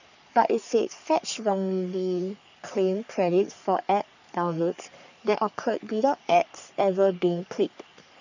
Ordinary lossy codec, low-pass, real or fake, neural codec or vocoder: none; 7.2 kHz; fake; codec, 44.1 kHz, 3.4 kbps, Pupu-Codec